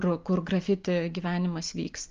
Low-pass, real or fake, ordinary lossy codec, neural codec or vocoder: 7.2 kHz; real; Opus, 32 kbps; none